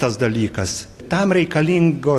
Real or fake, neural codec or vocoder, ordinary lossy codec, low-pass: fake; vocoder, 44.1 kHz, 128 mel bands every 256 samples, BigVGAN v2; AAC, 48 kbps; 14.4 kHz